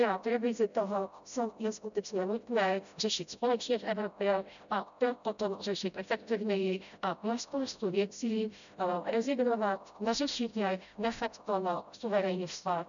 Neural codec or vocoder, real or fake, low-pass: codec, 16 kHz, 0.5 kbps, FreqCodec, smaller model; fake; 7.2 kHz